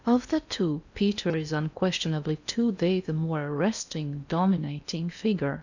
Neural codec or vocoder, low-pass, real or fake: codec, 16 kHz in and 24 kHz out, 0.8 kbps, FocalCodec, streaming, 65536 codes; 7.2 kHz; fake